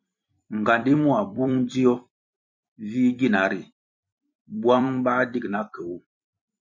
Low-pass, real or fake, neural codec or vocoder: 7.2 kHz; fake; vocoder, 44.1 kHz, 128 mel bands every 256 samples, BigVGAN v2